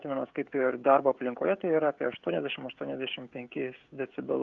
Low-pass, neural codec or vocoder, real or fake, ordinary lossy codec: 7.2 kHz; codec, 16 kHz, 16 kbps, FreqCodec, smaller model; fake; Opus, 64 kbps